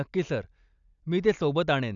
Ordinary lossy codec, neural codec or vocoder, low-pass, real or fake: none; none; 7.2 kHz; real